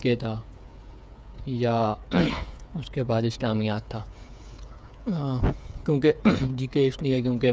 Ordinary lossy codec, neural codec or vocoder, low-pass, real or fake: none; codec, 16 kHz, 8 kbps, FreqCodec, smaller model; none; fake